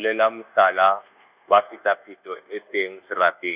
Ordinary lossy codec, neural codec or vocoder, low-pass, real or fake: Opus, 64 kbps; codec, 24 kHz, 1.2 kbps, DualCodec; 3.6 kHz; fake